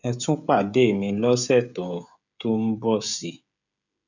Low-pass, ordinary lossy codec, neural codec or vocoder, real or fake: 7.2 kHz; none; codec, 16 kHz, 16 kbps, FreqCodec, smaller model; fake